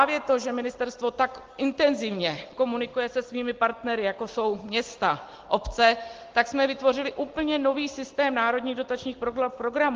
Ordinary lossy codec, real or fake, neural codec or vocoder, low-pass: Opus, 16 kbps; real; none; 7.2 kHz